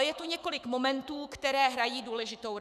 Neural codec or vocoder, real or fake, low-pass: autoencoder, 48 kHz, 128 numbers a frame, DAC-VAE, trained on Japanese speech; fake; 14.4 kHz